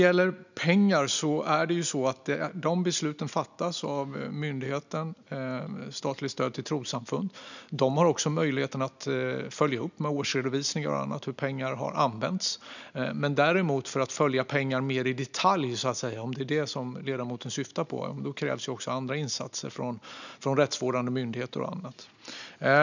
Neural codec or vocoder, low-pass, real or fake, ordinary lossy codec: none; 7.2 kHz; real; none